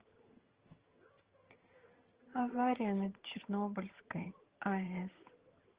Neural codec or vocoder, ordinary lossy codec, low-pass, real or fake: vocoder, 22.05 kHz, 80 mel bands, HiFi-GAN; Opus, 16 kbps; 3.6 kHz; fake